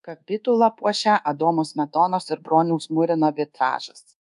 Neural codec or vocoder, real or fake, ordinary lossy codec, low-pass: codec, 24 kHz, 1.2 kbps, DualCodec; fake; AAC, 96 kbps; 10.8 kHz